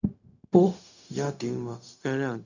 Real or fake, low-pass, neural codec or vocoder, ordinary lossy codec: fake; 7.2 kHz; codec, 16 kHz, 0.4 kbps, LongCat-Audio-Codec; none